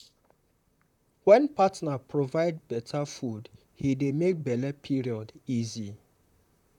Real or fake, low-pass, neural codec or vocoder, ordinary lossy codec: fake; 19.8 kHz; vocoder, 44.1 kHz, 128 mel bands, Pupu-Vocoder; none